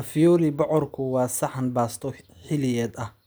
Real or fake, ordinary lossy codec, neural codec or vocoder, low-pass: real; none; none; none